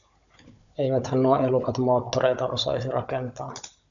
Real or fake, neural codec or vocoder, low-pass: fake; codec, 16 kHz, 16 kbps, FunCodec, trained on Chinese and English, 50 frames a second; 7.2 kHz